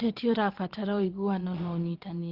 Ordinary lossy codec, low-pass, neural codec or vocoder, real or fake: Opus, 16 kbps; 5.4 kHz; vocoder, 22.05 kHz, 80 mel bands, Vocos; fake